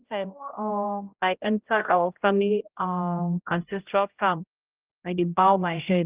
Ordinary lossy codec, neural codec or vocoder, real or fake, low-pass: Opus, 32 kbps; codec, 16 kHz, 0.5 kbps, X-Codec, HuBERT features, trained on general audio; fake; 3.6 kHz